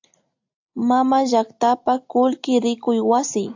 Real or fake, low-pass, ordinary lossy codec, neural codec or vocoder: real; 7.2 kHz; AAC, 48 kbps; none